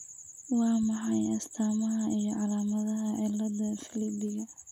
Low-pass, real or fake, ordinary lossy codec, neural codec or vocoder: 19.8 kHz; real; none; none